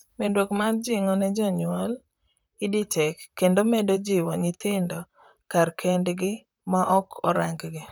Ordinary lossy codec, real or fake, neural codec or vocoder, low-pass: none; fake; vocoder, 44.1 kHz, 128 mel bands, Pupu-Vocoder; none